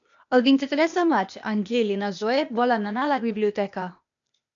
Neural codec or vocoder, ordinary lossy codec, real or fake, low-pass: codec, 16 kHz, 0.8 kbps, ZipCodec; MP3, 64 kbps; fake; 7.2 kHz